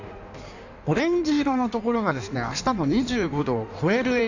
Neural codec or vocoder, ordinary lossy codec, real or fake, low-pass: codec, 16 kHz in and 24 kHz out, 1.1 kbps, FireRedTTS-2 codec; none; fake; 7.2 kHz